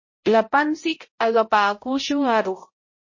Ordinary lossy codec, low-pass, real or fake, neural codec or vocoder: MP3, 32 kbps; 7.2 kHz; fake; codec, 16 kHz, 0.5 kbps, X-Codec, HuBERT features, trained on balanced general audio